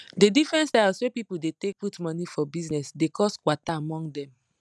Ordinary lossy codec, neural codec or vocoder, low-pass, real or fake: none; none; none; real